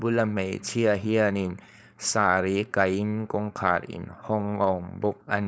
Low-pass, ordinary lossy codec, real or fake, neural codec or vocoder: none; none; fake; codec, 16 kHz, 4.8 kbps, FACodec